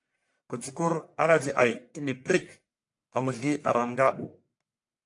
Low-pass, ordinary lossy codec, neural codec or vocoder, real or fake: 10.8 kHz; AAC, 64 kbps; codec, 44.1 kHz, 1.7 kbps, Pupu-Codec; fake